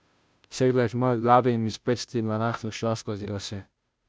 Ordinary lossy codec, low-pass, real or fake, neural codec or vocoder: none; none; fake; codec, 16 kHz, 0.5 kbps, FunCodec, trained on Chinese and English, 25 frames a second